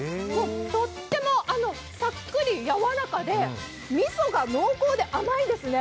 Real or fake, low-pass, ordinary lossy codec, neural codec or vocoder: real; none; none; none